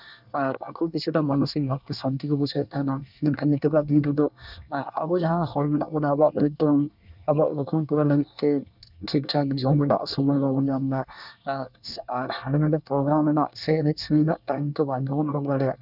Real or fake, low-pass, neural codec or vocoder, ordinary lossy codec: fake; 5.4 kHz; codec, 24 kHz, 1 kbps, SNAC; none